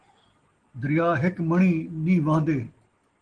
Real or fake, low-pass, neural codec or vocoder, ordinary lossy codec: real; 9.9 kHz; none; Opus, 16 kbps